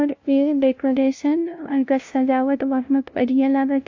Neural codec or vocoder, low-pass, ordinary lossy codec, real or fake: codec, 16 kHz, 0.5 kbps, FunCodec, trained on LibriTTS, 25 frames a second; 7.2 kHz; AAC, 48 kbps; fake